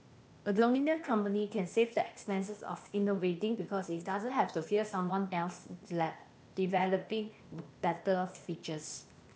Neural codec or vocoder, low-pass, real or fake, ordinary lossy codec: codec, 16 kHz, 0.8 kbps, ZipCodec; none; fake; none